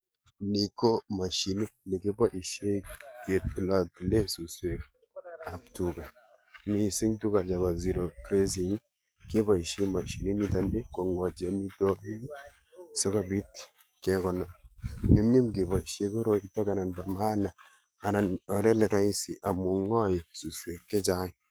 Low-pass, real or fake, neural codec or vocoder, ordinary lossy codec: none; fake; codec, 44.1 kHz, 7.8 kbps, DAC; none